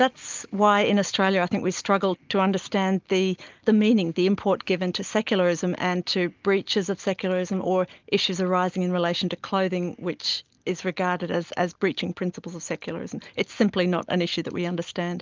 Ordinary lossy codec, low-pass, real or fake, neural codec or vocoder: Opus, 24 kbps; 7.2 kHz; real; none